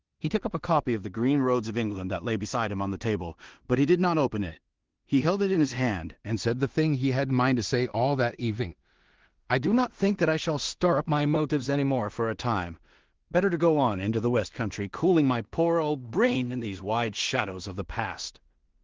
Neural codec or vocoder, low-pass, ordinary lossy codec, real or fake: codec, 16 kHz in and 24 kHz out, 0.4 kbps, LongCat-Audio-Codec, two codebook decoder; 7.2 kHz; Opus, 16 kbps; fake